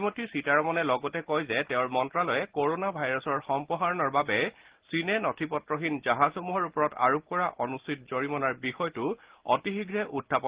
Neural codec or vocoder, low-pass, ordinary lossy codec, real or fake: none; 3.6 kHz; Opus, 16 kbps; real